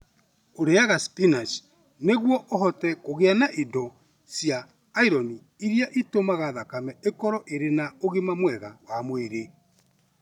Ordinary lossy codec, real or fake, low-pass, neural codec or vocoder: none; real; 19.8 kHz; none